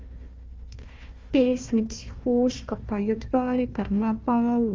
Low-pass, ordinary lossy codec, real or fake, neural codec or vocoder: 7.2 kHz; Opus, 32 kbps; fake; codec, 16 kHz, 1 kbps, FunCodec, trained on Chinese and English, 50 frames a second